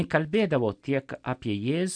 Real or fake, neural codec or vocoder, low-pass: real; none; 9.9 kHz